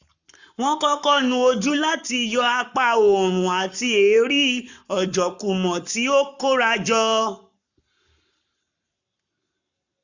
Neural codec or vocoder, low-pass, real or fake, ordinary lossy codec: codec, 44.1 kHz, 7.8 kbps, Pupu-Codec; 7.2 kHz; fake; none